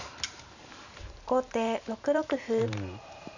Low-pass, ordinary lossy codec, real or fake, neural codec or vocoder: 7.2 kHz; none; fake; vocoder, 44.1 kHz, 128 mel bands every 512 samples, BigVGAN v2